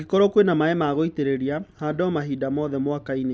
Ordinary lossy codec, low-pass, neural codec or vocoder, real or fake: none; none; none; real